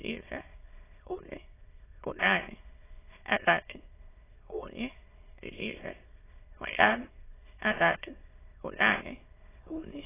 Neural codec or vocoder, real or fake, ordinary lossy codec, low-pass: autoencoder, 22.05 kHz, a latent of 192 numbers a frame, VITS, trained on many speakers; fake; AAC, 16 kbps; 3.6 kHz